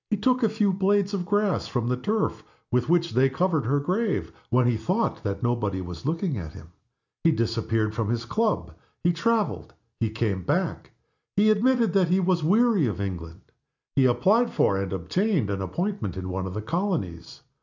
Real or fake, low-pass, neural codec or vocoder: real; 7.2 kHz; none